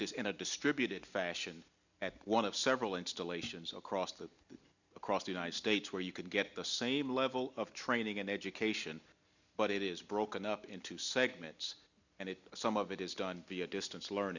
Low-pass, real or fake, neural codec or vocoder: 7.2 kHz; real; none